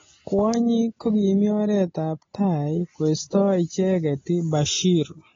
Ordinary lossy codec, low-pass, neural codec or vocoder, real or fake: AAC, 24 kbps; 7.2 kHz; none; real